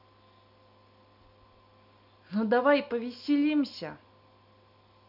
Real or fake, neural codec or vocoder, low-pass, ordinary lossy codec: real; none; 5.4 kHz; none